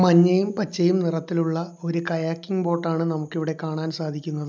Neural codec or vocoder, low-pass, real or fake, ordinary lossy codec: none; none; real; none